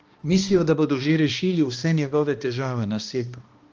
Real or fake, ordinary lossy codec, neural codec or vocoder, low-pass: fake; Opus, 24 kbps; codec, 16 kHz, 1 kbps, X-Codec, HuBERT features, trained on balanced general audio; 7.2 kHz